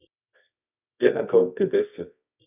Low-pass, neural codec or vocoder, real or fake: 3.6 kHz; codec, 24 kHz, 0.9 kbps, WavTokenizer, medium music audio release; fake